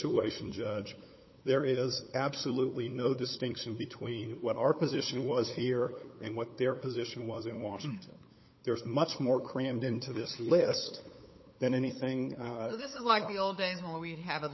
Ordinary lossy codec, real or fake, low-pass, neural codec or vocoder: MP3, 24 kbps; fake; 7.2 kHz; codec, 16 kHz, 8 kbps, FunCodec, trained on LibriTTS, 25 frames a second